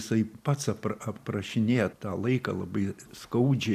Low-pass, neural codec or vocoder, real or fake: 14.4 kHz; none; real